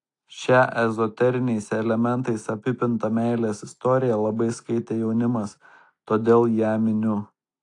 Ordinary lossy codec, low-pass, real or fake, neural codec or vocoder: AAC, 48 kbps; 10.8 kHz; real; none